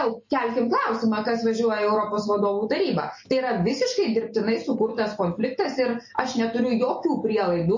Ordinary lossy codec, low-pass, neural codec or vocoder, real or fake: MP3, 32 kbps; 7.2 kHz; none; real